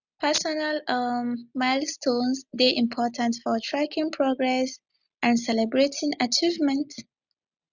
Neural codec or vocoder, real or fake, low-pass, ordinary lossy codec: none; real; 7.2 kHz; none